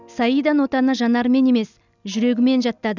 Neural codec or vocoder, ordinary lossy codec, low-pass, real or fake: none; none; 7.2 kHz; real